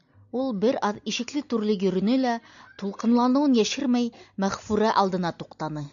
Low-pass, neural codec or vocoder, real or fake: 7.2 kHz; none; real